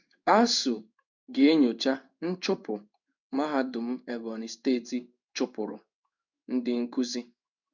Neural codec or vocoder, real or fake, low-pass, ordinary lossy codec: codec, 16 kHz in and 24 kHz out, 1 kbps, XY-Tokenizer; fake; 7.2 kHz; none